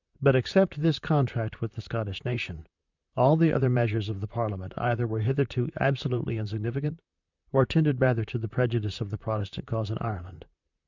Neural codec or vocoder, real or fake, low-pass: vocoder, 44.1 kHz, 128 mel bands, Pupu-Vocoder; fake; 7.2 kHz